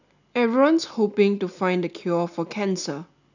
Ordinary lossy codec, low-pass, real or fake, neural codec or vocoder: none; 7.2 kHz; real; none